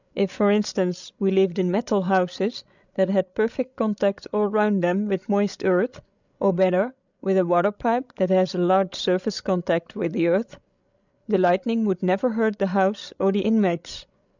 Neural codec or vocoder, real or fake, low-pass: codec, 16 kHz, 16 kbps, FreqCodec, larger model; fake; 7.2 kHz